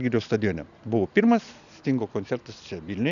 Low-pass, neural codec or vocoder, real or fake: 7.2 kHz; none; real